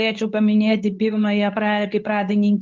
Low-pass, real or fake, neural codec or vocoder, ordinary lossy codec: 7.2 kHz; fake; codec, 24 kHz, 0.9 kbps, WavTokenizer, medium speech release version 2; Opus, 32 kbps